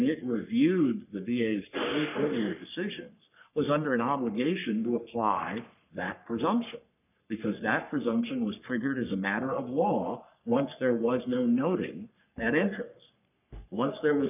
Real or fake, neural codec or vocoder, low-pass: fake; codec, 44.1 kHz, 3.4 kbps, Pupu-Codec; 3.6 kHz